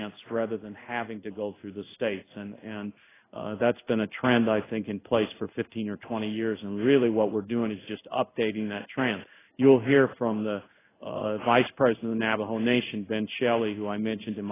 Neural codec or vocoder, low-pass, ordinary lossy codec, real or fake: codec, 16 kHz in and 24 kHz out, 1 kbps, XY-Tokenizer; 3.6 kHz; AAC, 16 kbps; fake